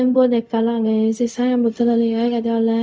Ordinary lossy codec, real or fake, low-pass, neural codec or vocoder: none; fake; none; codec, 16 kHz, 0.4 kbps, LongCat-Audio-Codec